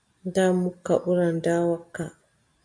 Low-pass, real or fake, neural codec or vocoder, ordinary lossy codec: 9.9 kHz; real; none; MP3, 96 kbps